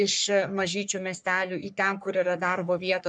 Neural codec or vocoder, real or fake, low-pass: codec, 44.1 kHz, 3.4 kbps, Pupu-Codec; fake; 9.9 kHz